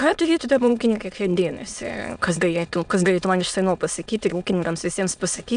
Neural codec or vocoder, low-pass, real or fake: autoencoder, 22.05 kHz, a latent of 192 numbers a frame, VITS, trained on many speakers; 9.9 kHz; fake